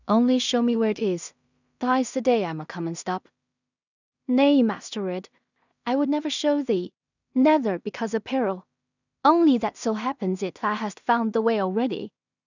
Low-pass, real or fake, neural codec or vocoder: 7.2 kHz; fake; codec, 16 kHz in and 24 kHz out, 0.4 kbps, LongCat-Audio-Codec, two codebook decoder